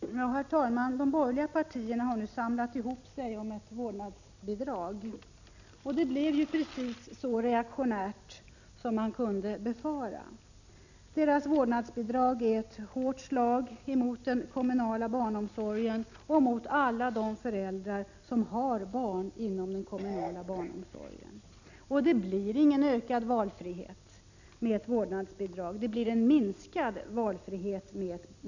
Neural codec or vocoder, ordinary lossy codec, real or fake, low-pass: none; none; real; 7.2 kHz